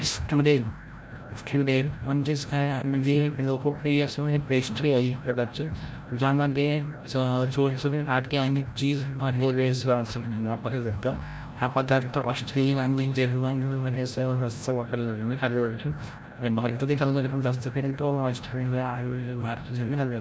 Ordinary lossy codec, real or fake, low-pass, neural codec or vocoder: none; fake; none; codec, 16 kHz, 0.5 kbps, FreqCodec, larger model